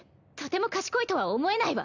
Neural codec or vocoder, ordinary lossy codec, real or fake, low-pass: none; none; real; 7.2 kHz